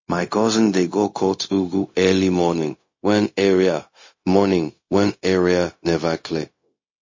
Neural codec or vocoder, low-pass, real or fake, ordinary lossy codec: codec, 16 kHz, 0.4 kbps, LongCat-Audio-Codec; 7.2 kHz; fake; MP3, 32 kbps